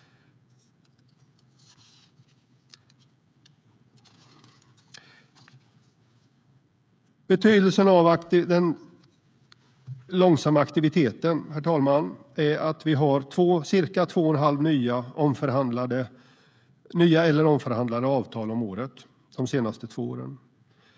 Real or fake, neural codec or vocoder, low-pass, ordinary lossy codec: fake; codec, 16 kHz, 16 kbps, FreqCodec, smaller model; none; none